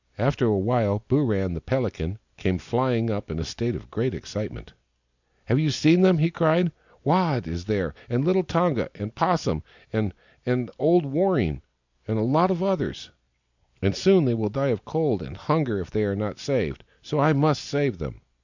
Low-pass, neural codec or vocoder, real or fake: 7.2 kHz; none; real